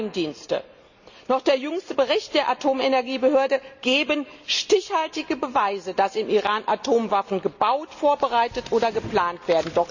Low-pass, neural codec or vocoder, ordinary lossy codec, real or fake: 7.2 kHz; none; none; real